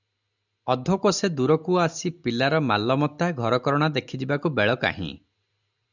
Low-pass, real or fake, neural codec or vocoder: 7.2 kHz; real; none